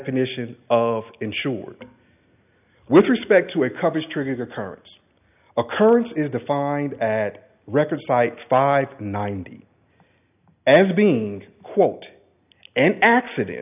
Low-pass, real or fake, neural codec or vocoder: 3.6 kHz; real; none